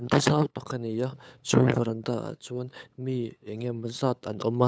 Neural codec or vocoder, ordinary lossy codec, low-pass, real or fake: codec, 16 kHz, 8 kbps, FunCodec, trained on LibriTTS, 25 frames a second; none; none; fake